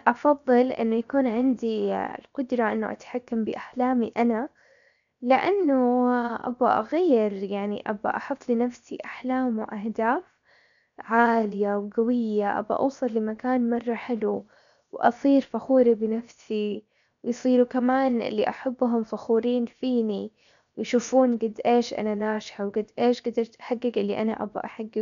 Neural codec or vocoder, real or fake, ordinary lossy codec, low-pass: codec, 16 kHz, 0.7 kbps, FocalCodec; fake; none; 7.2 kHz